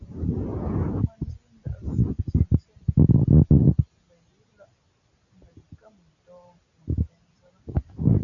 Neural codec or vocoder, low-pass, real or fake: none; 7.2 kHz; real